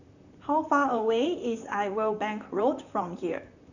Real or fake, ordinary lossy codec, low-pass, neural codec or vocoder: fake; none; 7.2 kHz; vocoder, 44.1 kHz, 128 mel bands, Pupu-Vocoder